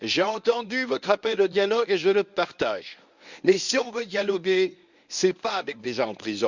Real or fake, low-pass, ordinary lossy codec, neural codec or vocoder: fake; 7.2 kHz; Opus, 64 kbps; codec, 24 kHz, 0.9 kbps, WavTokenizer, medium speech release version 1